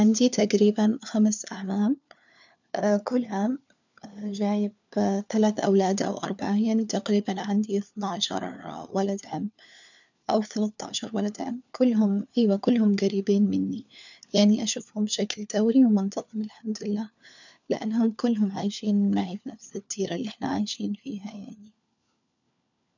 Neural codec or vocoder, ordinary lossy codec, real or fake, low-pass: codec, 16 kHz, 4 kbps, FunCodec, trained on LibriTTS, 50 frames a second; none; fake; 7.2 kHz